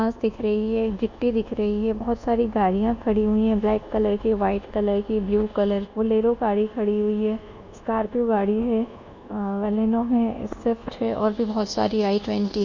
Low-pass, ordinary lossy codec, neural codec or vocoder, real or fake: 7.2 kHz; none; codec, 24 kHz, 1.2 kbps, DualCodec; fake